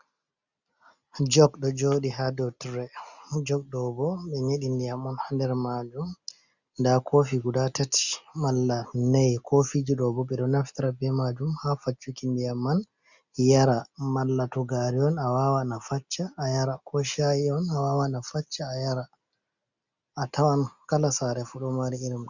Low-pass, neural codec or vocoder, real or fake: 7.2 kHz; none; real